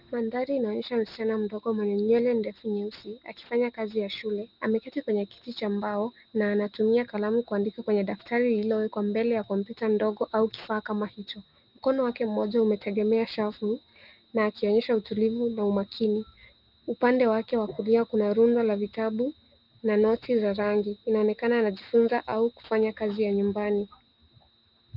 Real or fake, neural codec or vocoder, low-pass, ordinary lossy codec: real; none; 5.4 kHz; Opus, 32 kbps